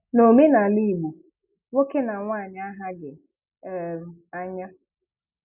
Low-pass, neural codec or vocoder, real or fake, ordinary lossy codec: 3.6 kHz; none; real; none